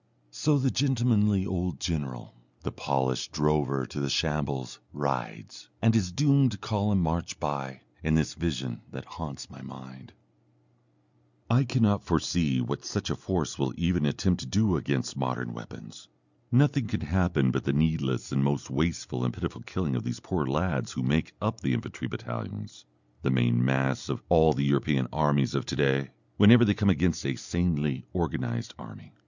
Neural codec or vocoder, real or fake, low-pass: none; real; 7.2 kHz